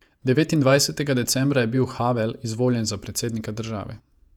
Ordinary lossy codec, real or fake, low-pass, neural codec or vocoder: none; fake; 19.8 kHz; vocoder, 48 kHz, 128 mel bands, Vocos